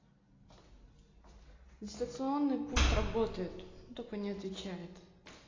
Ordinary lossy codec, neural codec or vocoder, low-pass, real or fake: AAC, 32 kbps; none; 7.2 kHz; real